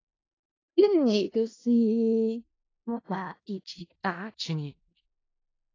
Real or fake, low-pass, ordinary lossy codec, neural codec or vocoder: fake; 7.2 kHz; AAC, 32 kbps; codec, 16 kHz in and 24 kHz out, 0.4 kbps, LongCat-Audio-Codec, four codebook decoder